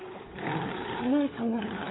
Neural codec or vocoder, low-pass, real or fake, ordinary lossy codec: codec, 16 kHz, 16 kbps, FunCodec, trained on LibriTTS, 50 frames a second; 7.2 kHz; fake; AAC, 16 kbps